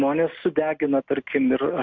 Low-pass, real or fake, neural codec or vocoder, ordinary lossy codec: 7.2 kHz; real; none; MP3, 48 kbps